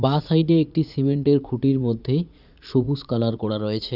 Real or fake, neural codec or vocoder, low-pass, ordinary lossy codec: fake; vocoder, 44.1 kHz, 80 mel bands, Vocos; 5.4 kHz; none